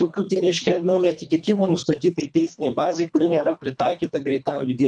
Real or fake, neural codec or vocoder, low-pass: fake; codec, 24 kHz, 1.5 kbps, HILCodec; 9.9 kHz